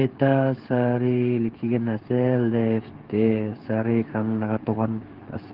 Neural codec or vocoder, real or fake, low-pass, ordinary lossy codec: codec, 16 kHz, 8 kbps, FreqCodec, smaller model; fake; 5.4 kHz; Opus, 16 kbps